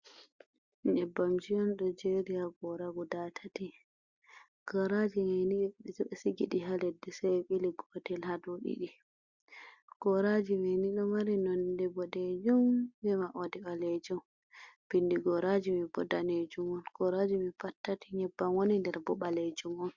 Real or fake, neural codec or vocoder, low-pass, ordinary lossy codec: real; none; 7.2 kHz; Opus, 64 kbps